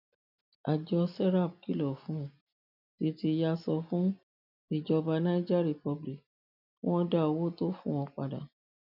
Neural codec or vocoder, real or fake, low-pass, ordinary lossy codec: none; real; 5.4 kHz; none